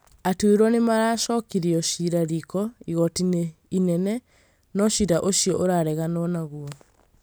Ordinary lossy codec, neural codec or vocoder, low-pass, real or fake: none; none; none; real